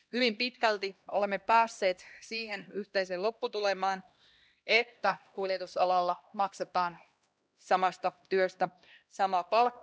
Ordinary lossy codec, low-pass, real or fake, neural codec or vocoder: none; none; fake; codec, 16 kHz, 1 kbps, X-Codec, HuBERT features, trained on LibriSpeech